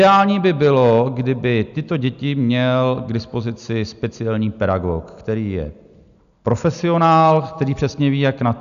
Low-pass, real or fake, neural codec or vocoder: 7.2 kHz; real; none